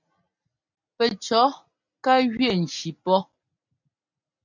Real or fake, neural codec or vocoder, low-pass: real; none; 7.2 kHz